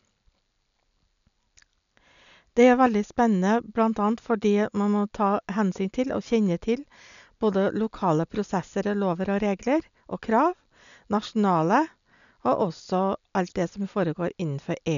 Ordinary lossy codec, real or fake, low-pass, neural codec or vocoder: none; real; 7.2 kHz; none